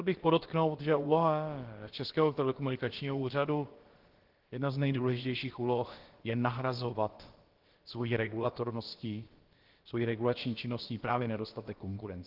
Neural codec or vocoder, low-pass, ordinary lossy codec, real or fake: codec, 16 kHz, about 1 kbps, DyCAST, with the encoder's durations; 5.4 kHz; Opus, 16 kbps; fake